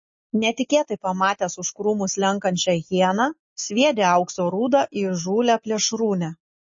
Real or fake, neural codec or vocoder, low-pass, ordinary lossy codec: real; none; 7.2 kHz; MP3, 32 kbps